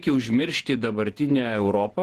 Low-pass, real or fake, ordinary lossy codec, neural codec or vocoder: 14.4 kHz; fake; Opus, 16 kbps; vocoder, 48 kHz, 128 mel bands, Vocos